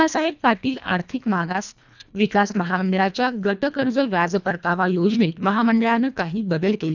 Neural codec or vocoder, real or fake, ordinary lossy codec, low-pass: codec, 24 kHz, 1.5 kbps, HILCodec; fake; none; 7.2 kHz